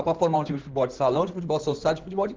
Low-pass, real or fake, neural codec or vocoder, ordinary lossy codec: 7.2 kHz; fake; codec, 16 kHz in and 24 kHz out, 2.2 kbps, FireRedTTS-2 codec; Opus, 16 kbps